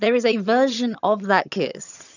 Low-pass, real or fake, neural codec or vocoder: 7.2 kHz; fake; vocoder, 22.05 kHz, 80 mel bands, HiFi-GAN